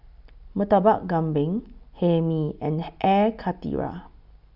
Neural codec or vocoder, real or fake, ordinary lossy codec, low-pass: none; real; none; 5.4 kHz